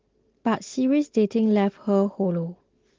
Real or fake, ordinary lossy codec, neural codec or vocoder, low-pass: real; Opus, 16 kbps; none; 7.2 kHz